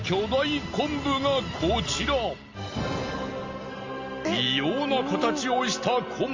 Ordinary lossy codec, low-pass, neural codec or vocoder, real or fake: Opus, 32 kbps; 7.2 kHz; none; real